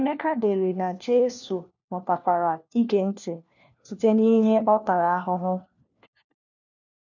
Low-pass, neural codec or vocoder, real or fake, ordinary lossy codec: 7.2 kHz; codec, 16 kHz, 1 kbps, FunCodec, trained on LibriTTS, 50 frames a second; fake; none